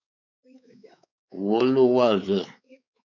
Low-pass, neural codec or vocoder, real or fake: 7.2 kHz; codec, 16 kHz, 4 kbps, X-Codec, WavLM features, trained on Multilingual LibriSpeech; fake